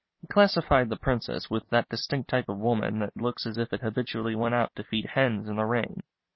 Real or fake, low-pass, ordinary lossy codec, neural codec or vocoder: fake; 7.2 kHz; MP3, 24 kbps; vocoder, 44.1 kHz, 128 mel bands every 256 samples, BigVGAN v2